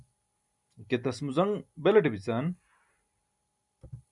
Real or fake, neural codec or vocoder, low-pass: real; none; 10.8 kHz